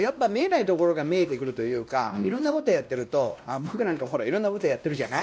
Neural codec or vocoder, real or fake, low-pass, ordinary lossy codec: codec, 16 kHz, 1 kbps, X-Codec, WavLM features, trained on Multilingual LibriSpeech; fake; none; none